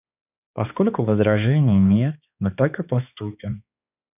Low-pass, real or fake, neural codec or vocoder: 3.6 kHz; fake; codec, 16 kHz, 2 kbps, X-Codec, HuBERT features, trained on balanced general audio